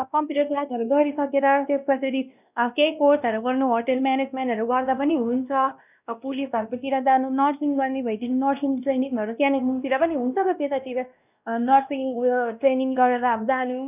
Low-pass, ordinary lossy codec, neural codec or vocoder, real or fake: 3.6 kHz; none; codec, 16 kHz, 1 kbps, X-Codec, WavLM features, trained on Multilingual LibriSpeech; fake